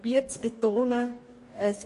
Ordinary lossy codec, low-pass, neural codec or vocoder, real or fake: MP3, 48 kbps; 14.4 kHz; codec, 44.1 kHz, 2.6 kbps, DAC; fake